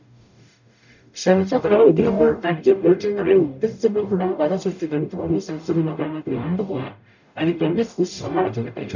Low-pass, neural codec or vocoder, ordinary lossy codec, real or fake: 7.2 kHz; codec, 44.1 kHz, 0.9 kbps, DAC; none; fake